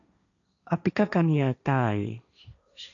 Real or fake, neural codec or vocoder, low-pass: fake; codec, 16 kHz, 1.1 kbps, Voila-Tokenizer; 7.2 kHz